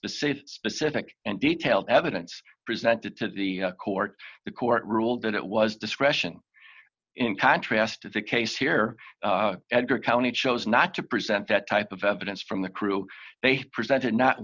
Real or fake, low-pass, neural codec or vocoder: real; 7.2 kHz; none